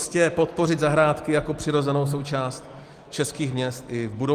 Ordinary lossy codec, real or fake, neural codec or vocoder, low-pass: Opus, 24 kbps; real; none; 14.4 kHz